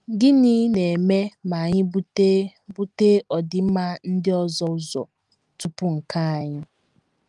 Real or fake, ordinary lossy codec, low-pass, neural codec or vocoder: real; Opus, 32 kbps; 10.8 kHz; none